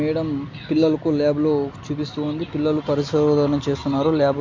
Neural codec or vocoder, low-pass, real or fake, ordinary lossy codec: none; 7.2 kHz; real; MP3, 48 kbps